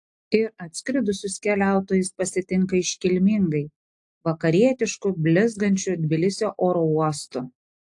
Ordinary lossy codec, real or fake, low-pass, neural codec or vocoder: AAC, 64 kbps; real; 10.8 kHz; none